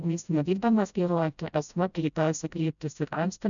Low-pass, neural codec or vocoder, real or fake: 7.2 kHz; codec, 16 kHz, 0.5 kbps, FreqCodec, smaller model; fake